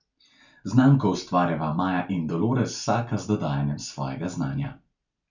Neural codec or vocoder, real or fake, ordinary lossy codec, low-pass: none; real; none; 7.2 kHz